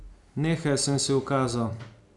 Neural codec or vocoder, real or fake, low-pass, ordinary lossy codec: none; real; 10.8 kHz; none